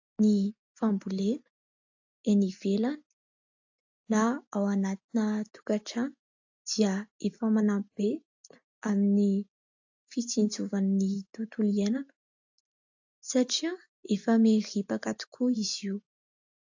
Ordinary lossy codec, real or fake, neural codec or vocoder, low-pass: AAC, 48 kbps; real; none; 7.2 kHz